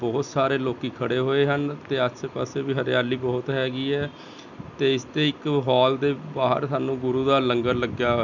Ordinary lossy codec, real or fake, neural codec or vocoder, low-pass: none; real; none; 7.2 kHz